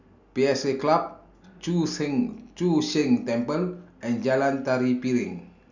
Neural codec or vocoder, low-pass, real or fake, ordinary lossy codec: none; 7.2 kHz; real; none